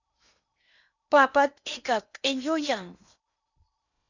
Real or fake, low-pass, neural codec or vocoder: fake; 7.2 kHz; codec, 16 kHz in and 24 kHz out, 0.8 kbps, FocalCodec, streaming, 65536 codes